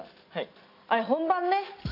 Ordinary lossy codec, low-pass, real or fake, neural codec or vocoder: AAC, 32 kbps; 5.4 kHz; real; none